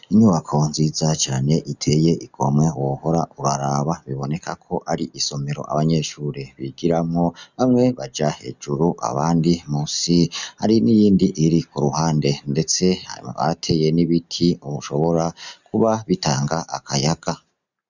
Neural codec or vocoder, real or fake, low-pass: none; real; 7.2 kHz